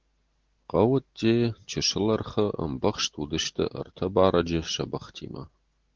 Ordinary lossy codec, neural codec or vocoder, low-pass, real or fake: Opus, 16 kbps; none; 7.2 kHz; real